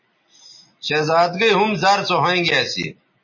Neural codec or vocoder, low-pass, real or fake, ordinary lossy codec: none; 7.2 kHz; real; MP3, 32 kbps